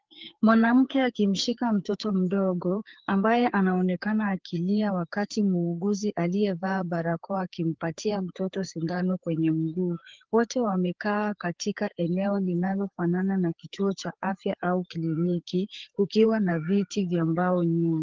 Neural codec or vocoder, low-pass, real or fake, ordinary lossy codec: codec, 16 kHz, 4 kbps, FreqCodec, larger model; 7.2 kHz; fake; Opus, 16 kbps